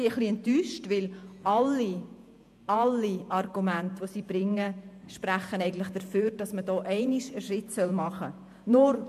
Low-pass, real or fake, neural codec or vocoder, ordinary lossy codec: 14.4 kHz; fake; vocoder, 48 kHz, 128 mel bands, Vocos; MP3, 64 kbps